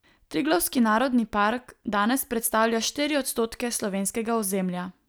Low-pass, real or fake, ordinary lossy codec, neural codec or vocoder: none; real; none; none